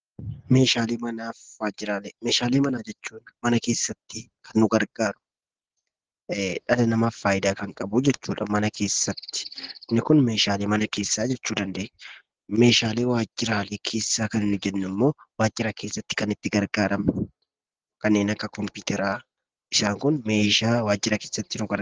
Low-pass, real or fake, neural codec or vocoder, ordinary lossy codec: 7.2 kHz; real; none; Opus, 16 kbps